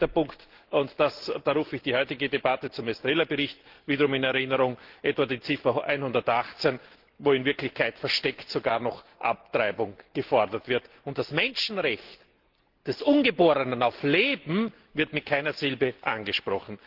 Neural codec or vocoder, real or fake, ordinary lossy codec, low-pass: none; real; Opus, 16 kbps; 5.4 kHz